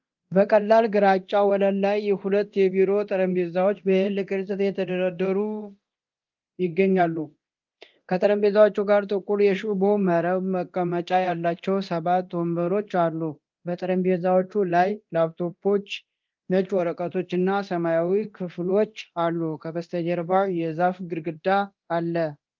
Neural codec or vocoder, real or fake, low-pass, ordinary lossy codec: codec, 24 kHz, 0.9 kbps, DualCodec; fake; 7.2 kHz; Opus, 24 kbps